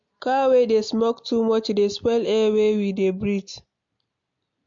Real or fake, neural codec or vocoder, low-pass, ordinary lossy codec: real; none; 7.2 kHz; MP3, 48 kbps